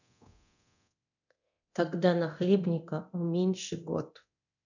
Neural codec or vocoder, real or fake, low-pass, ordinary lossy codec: codec, 24 kHz, 0.9 kbps, DualCodec; fake; 7.2 kHz; none